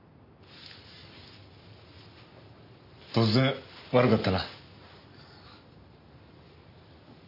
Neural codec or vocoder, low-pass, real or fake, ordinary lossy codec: none; 5.4 kHz; real; none